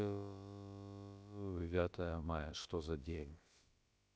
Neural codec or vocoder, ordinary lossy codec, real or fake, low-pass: codec, 16 kHz, about 1 kbps, DyCAST, with the encoder's durations; none; fake; none